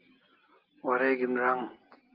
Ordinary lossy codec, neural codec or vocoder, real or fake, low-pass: Opus, 32 kbps; none; real; 5.4 kHz